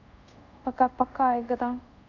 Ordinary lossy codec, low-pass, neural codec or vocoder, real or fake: none; 7.2 kHz; codec, 24 kHz, 0.5 kbps, DualCodec; fake